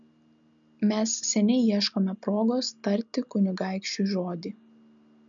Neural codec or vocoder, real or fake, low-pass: none; real; 7.2 kHz